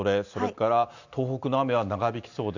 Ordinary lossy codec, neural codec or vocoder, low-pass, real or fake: none; none; 7.2 kHz; real